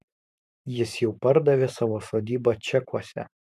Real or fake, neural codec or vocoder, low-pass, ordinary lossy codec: fake; vocoder, 44.1 kHz, 128 mel bands every 512 samples, BigVGAN v2; 14.4 kHz; MP3, 96 kbps